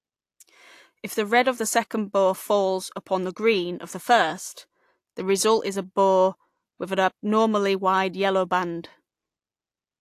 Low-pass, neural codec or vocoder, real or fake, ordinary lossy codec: 14.4 kHz; none; real; AAC, 64 kbps